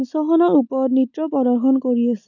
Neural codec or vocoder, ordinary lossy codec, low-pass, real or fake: none; none; 7.2 kHz; real